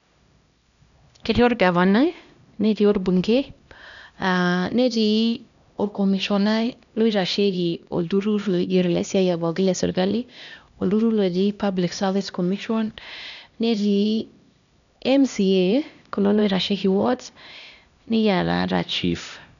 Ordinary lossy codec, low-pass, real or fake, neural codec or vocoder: none; 7.2 kHz; fake; codec, 16 kHz, 1 kbps, X-Codec, HuBERT features, trained on LibriSpeech